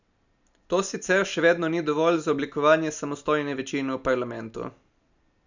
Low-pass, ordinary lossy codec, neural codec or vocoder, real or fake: 7.2 kHz; none; none; real